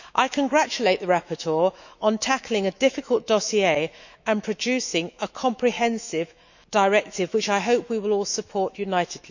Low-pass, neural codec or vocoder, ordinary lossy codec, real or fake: 7.2 kHz; autoencoder, 48 kHz, 128 numbers a frame, DAC-VAE, trained on Japanese speech; none; fake